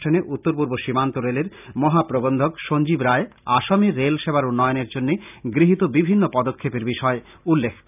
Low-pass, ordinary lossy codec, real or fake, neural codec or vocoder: 3.6 kHz; none; real; none